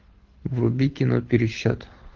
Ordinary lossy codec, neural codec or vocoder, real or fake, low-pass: Opus, 16 kbps; codec, 24 kHz, 6 kbps, HILCodec; fake; 7.2 kHz